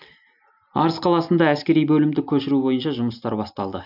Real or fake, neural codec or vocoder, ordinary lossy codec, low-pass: real; none; none; 5.4 kHz